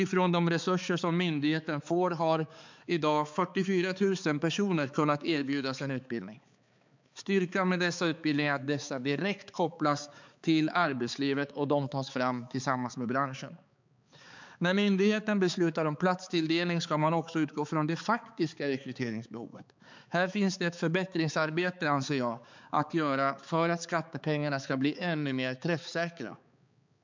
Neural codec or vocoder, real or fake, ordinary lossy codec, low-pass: codec, 16 kHz, 4 kbps, X-Codec, HuBERT features, trained on balanced general audio; fake; MP3, 64 kbps; 7.2 kHz